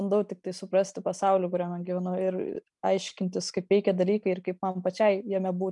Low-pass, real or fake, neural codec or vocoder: 10.8 kHz; real; none